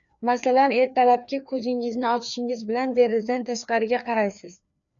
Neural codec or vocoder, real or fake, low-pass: codec, 16 kHz, 2 kbps, FreqCodec, larger model; fake; 7.2 kHz